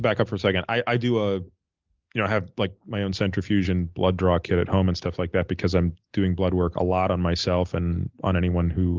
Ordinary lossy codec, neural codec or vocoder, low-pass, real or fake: Opus, 32 kbps; none; 7.2 kHz; real